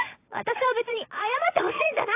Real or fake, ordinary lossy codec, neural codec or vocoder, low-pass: fake; AAC, 24 kbps; vocoder, 22.05 kHz, 80 mel bands, Vocos; 3.6 kHz